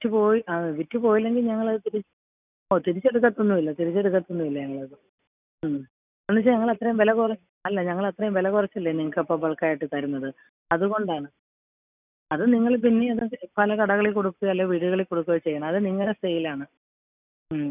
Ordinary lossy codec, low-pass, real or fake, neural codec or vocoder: none; 3.6 kHz; real; none